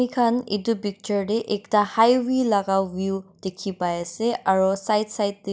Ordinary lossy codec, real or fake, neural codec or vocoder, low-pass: none; real; none; none